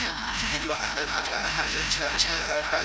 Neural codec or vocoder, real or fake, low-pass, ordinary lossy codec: codec, 16 kHz, 0.5 kbps, FreqCodec, larger model; fake; none; none